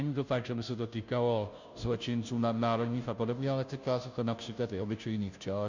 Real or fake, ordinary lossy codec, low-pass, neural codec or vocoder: fake; Opus, 64 kbps; 7.2 kHz; codec, 16 kHz, 0.5 kbps, FunCodec, trained on Chinese and English, 25 frames a second